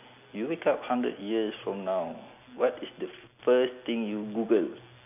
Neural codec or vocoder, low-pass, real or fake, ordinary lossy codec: none; 3.6 kHz; real; none